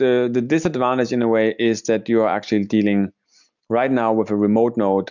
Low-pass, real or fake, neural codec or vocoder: 7.2 kHz; real; none